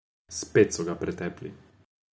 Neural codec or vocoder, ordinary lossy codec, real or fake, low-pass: none; none; real; none